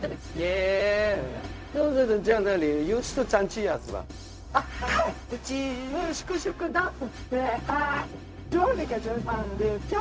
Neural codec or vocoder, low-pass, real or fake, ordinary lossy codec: codec, 16 kHz, 0.4 kbps, LongCat-Audio-Codec; none; fake; none